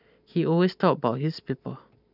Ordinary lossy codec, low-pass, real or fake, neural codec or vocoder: none; 5.4 kHz; fake; vocoder, 44.1 kHz, 128 mel bands every 512 samples, BigVGAN v2